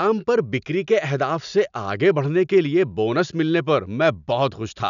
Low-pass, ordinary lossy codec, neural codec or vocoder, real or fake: 7.2 kHz; none; none; real